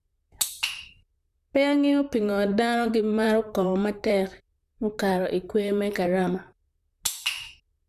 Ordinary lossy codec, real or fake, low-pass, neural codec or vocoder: none; fake; 14.4 kHz; vocoder, 44.1 kHz, 128 mel bands, Pupu-Vocoder